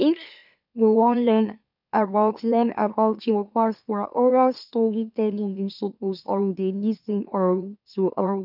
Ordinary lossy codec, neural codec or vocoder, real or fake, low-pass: none; autoencoder, 44.1 kHz, a latent of 192 numbers a frame, MeloTTS; fake; 5.4 kHz